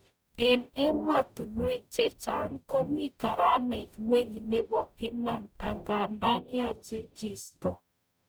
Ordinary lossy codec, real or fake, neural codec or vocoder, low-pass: none; fake; codec, 44.1 kHz, 0.9 kbps, DAC; none